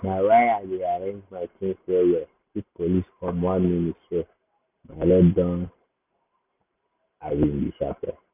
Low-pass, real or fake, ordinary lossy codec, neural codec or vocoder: 3.6 kHz; real; none; none